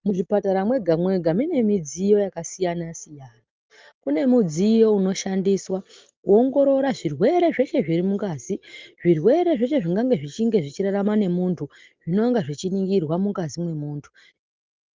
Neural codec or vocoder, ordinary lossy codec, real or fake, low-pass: none; Opus, 24 kbps; real; 7.2 kHz